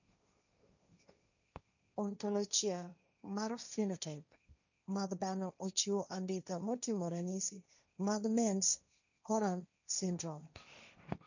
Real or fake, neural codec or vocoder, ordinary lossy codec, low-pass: fake; codec, 16 kHz, 1.1 kbps, Voila-Tokenizer; none; 7.2 kHz